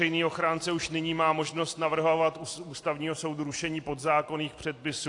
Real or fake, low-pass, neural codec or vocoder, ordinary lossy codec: real; 10.8 kHz; none; AAC, 48 kbps